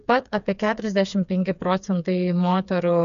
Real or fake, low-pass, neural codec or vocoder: fake; 7.2 kHz; codec, 16 kHz, 4 kbps, FreqCodec, smaller model